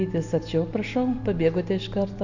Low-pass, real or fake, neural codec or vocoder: 7.2 kHz; real; none